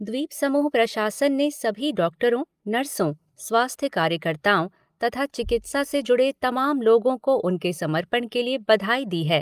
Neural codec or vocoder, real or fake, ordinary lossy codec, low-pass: none; real; Opus, 32 kbps; 14.4 kHz